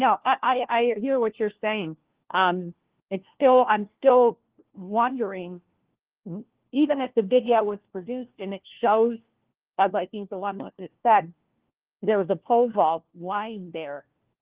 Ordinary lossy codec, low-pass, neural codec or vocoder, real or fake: Opus, 16 kbps; 3.6 kHz; codec, 16 kHz, 1 kbps, FunCodec, trained on LibriTTS, 50 frames a second; fake